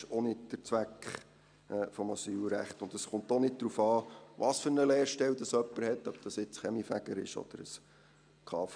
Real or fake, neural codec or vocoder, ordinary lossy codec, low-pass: real; none; none; 9.9 kHz